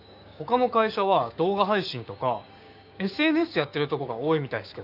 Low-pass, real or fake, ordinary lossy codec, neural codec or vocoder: 5.4 kHz; fake; none; codec, 16 kHz, 6 kbps, DAC